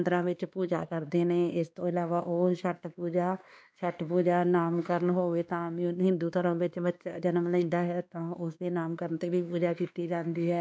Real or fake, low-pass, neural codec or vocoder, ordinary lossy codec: fake; none; codec, 16 kHz, 2 kbps, X-Codec, WavLM features, trained on Multilingual LibriSpeech; none